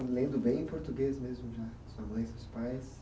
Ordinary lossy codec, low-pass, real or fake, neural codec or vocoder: none; none; real; none